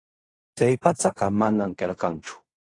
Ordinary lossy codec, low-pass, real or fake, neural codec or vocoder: AAC, 48 kbps; 10.8 kHz; fake; codec, 16 kHz in and 24 kHz out, 0.4 kbps, LongCat-Audio-Codec, fine tuned four codebook decoder